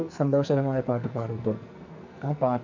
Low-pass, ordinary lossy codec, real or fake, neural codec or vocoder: 7.2 kHz; none; fake; codec, 44.1 kHz, 2.6 kbps, SNAC